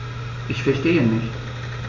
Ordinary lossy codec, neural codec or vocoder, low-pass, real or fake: MP3, 64 kbps; none; 7.2 kHz; real